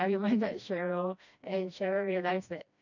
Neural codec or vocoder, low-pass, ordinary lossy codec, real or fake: codec, 16 kHz, 1 kbps, FreqCodec, smaller model; 7.2 kHz; none; fake